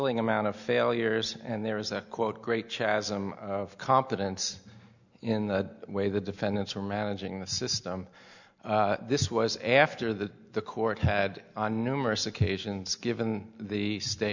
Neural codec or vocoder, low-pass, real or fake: none; 7.2 kHz; real